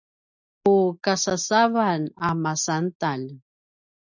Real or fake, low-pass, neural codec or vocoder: real; 7.2 kHz; none